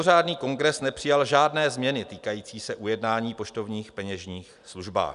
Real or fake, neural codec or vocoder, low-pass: real; none; 10.8 kHz